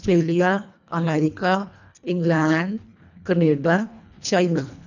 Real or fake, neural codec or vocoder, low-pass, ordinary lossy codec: fake; codec, 24 kHz, 1.5 kbps, HILCodec; 7.2 kHz; none